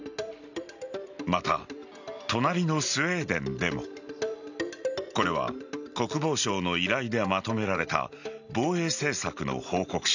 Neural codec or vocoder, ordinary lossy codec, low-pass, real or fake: none; none; 7.2 kHz; real